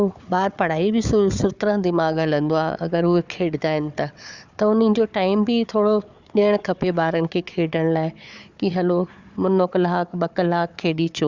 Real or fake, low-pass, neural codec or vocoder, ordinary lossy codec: fake; 7.2 kHz; codec, 16 kHz, 4 kbps, FunCodec, trained on Chinese and English, 50 frames a second; Opus, 64 kbps